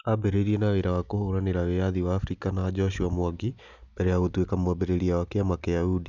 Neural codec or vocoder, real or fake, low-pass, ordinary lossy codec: none; real; 7.2 kHz; none